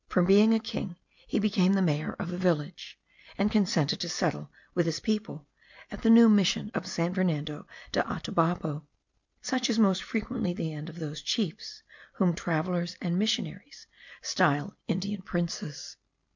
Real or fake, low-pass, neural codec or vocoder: real; 7.2 kHz; none